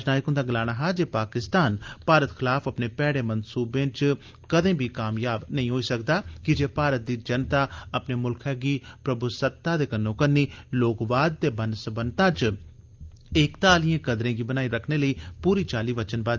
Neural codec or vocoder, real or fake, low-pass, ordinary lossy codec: none; real; 7.2 kHz; Opus, 24 kbps